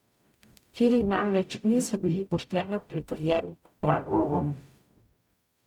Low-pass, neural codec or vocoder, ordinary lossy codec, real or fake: 19.8 kHz; codec, 44.1 kHz, 0.9 kbps, DAC; MP3, 96 kbps; fake